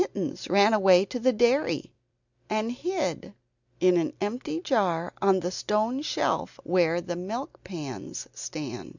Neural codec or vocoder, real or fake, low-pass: none; real; 7.2 kHz